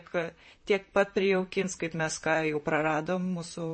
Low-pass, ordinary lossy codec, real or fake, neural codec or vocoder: 9.9 kHz; MP3, 32 kbps; fake; vocoder, 44.1 kHz, 128 mel bands, Pupu-Vocoder